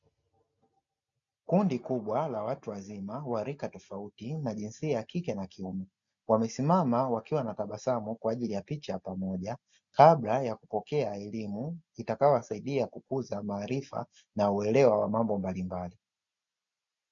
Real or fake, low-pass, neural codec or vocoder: real; 7.2 kHz; none